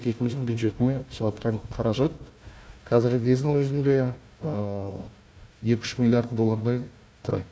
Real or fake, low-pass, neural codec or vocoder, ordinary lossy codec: fake; none; codec, 16 kHz, 1 kbps, FunCodec, trained on Chinese and English, 50 frames a second; none